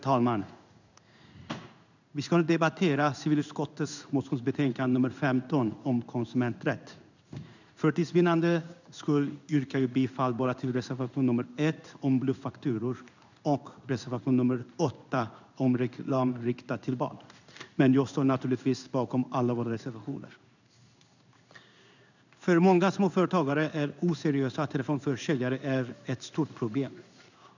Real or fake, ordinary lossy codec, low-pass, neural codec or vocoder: fake; none; 7.2 kHz; codec, 16 kHz in and 24 kHz out, 1 kbps, XY-Tokenizer